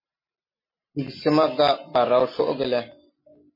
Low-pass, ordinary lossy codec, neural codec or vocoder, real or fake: 5.4 kHz; MP3, 24 kbps; none; real